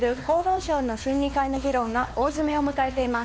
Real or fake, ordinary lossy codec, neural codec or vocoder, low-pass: fake; none; codec, 16 kHz, 2 kbps, X-Codec, WavLM features, trained on Multilingual LibriSpeech; none